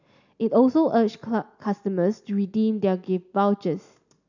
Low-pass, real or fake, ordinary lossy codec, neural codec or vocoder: 7.2 kHz; real; none; none